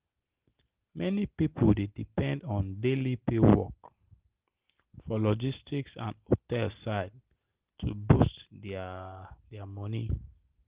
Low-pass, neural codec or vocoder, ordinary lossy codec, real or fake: 3.6 kHz; none; Opus, 16 kbps; real